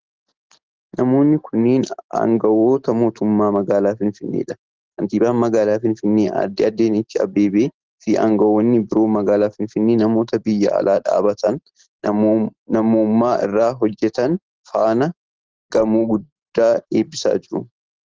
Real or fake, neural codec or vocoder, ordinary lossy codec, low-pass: real; none; Opus, 16 kbps; 7.2 kHz